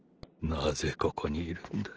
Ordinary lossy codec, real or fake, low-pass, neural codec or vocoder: Opus, 16 kbps; real; 7.2 kHz; none